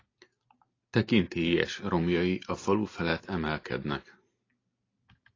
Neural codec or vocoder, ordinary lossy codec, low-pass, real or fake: none; AAC, 32 kbps; 7.2 kHz; real